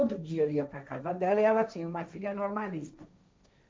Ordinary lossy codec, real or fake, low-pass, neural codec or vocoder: none; fake; none; codec, 16 kHz, 1.1 kbps, Voila-Tokenizer